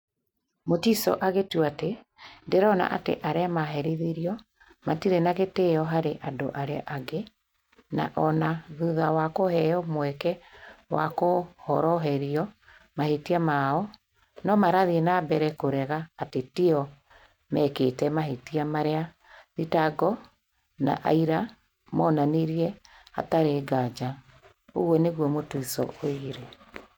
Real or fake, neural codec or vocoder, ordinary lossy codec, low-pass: real; none; none; 19.8 kHz